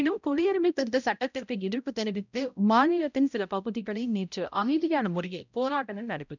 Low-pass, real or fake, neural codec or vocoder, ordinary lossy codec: 7.2 kHz; fake; codec, 16 kHz, 0.5 kbps, X-Codec, HuBERT features, trained on balanced general audio; none